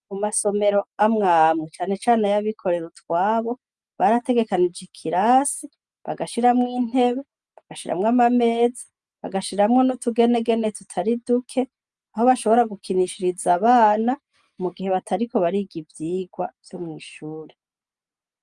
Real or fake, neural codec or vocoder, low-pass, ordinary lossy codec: real; none; 10.8 kHz; Opus, 32 kbps